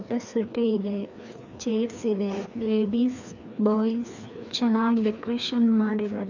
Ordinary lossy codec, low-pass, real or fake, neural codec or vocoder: none; 7.2 kHz; fake; codec, 16 kHz, 2 kbps, FreqCodec, larger model